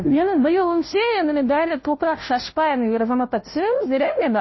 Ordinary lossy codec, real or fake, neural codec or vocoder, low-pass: MP3, 24 kbps; fake; codec, 16 kHz, 0.5 kbps, FunCodec, trained on Chinese and English, 25 frames a second; 7.2 kHz